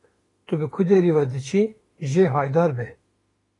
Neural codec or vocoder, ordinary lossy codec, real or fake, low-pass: autoencoder, 48 kHz, 32 numbers a frame, DAC-VAE, trained on Japanese speech; AAC, 32 kbps; fake; 10.8 kHz